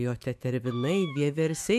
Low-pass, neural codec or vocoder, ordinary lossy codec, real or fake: 14.4 kHz; autoencoder, 48 kHz, 128 numbers a frame, DAC-VAE, trained on Japanese speech; MP3, 96 kbps; fake